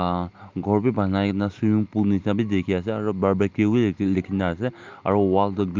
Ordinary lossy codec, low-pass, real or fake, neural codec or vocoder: Opus, 24 kbps; 7.2 kHz; real; none